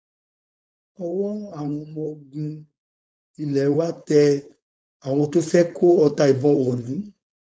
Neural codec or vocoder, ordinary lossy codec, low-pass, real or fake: codec, 16 kHz, 4.8 kbps, FACodec; none; none; fake